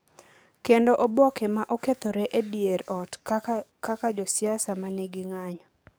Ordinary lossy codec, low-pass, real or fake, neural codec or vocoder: none; none; fake; codec, 44.1 kHz, 7.8 kbps, DAC